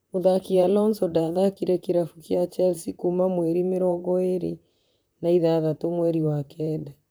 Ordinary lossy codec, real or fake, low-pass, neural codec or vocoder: none; fake; none; vocoder, 44.1 kHz, 128 mel bands, Pupu-Vocoder